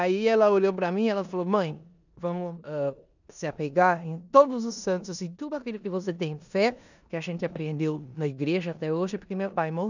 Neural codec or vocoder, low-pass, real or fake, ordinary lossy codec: codec, 16 kHz in and 24 kHz out, 0.9 kbps, LongCat-Audio-Codec, four codebook decoder; 7.2 kHz; fake; none